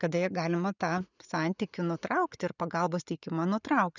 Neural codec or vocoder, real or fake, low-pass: none; real; 7.2 kHz